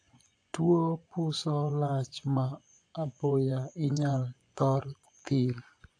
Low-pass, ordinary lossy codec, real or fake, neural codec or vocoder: 9.9 kHz; AAC, 48 kbps; fake; vocoder, 24 kHz, 100 mel bands, Vocos